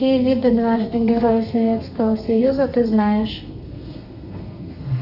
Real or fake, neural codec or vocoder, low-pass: fake; codec, 32 kHz, 1.9 kbps, SNAC; 5.4 kHz